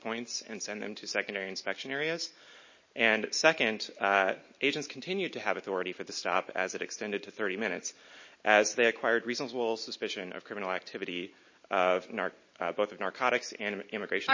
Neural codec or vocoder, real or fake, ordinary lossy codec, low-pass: none; real; MP3, 32 kbps; 7.2 kHz